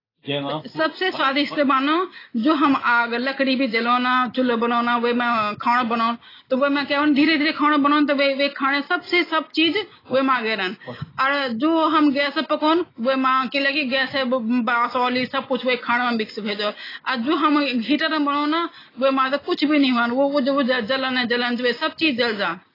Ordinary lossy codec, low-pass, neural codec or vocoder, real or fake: AAC, 24 kbps; 5.4 kHz; none; real